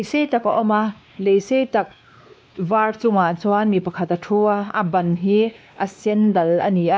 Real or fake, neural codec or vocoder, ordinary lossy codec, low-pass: fake; codec, 16 kHz, 2 kbps, X-Codec, WavLM features, trained on Multilingual LibriSpeech; none; none